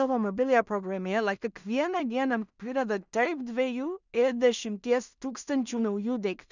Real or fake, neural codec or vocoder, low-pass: fake; codec, 16 kHz in and 24 kHz out, 0.4 kbps, LongCat-Audio-Codec, two codebook decoder; 7.2 kHz